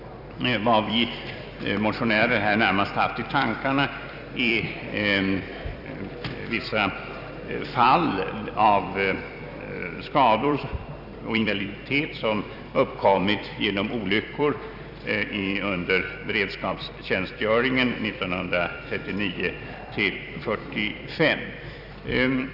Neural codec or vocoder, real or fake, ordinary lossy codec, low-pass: vocoder, 44.1 kHz, 128 mel bands every 256 samples, BigVGAN v2; fake; none; 5.4 kHz